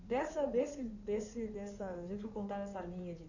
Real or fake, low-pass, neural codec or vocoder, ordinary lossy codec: fake; 7.2 kHz; codec, 16 kHz in and 24 kHz out, 2.2 kbps, FireRedTTS-2 codec; none